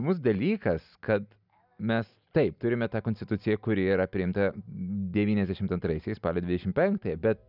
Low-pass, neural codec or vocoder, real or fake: 5.4 kHz; none; real